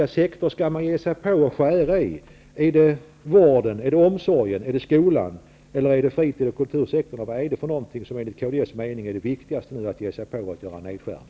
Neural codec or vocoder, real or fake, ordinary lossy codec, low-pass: none; real; none; none